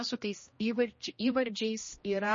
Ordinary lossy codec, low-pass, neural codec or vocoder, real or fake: MP3, 32 kbps; 7.2 kHz; codec, 16 kHz, 1 kbps, X-Codec, HuBERT features, trained on general audio; fake